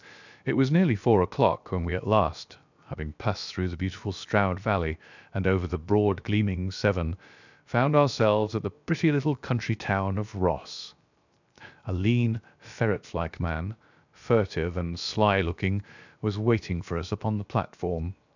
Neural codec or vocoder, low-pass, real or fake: codec, 16 kHz, 0.7 kbps, FocalCodec; 7.2 kHz; fake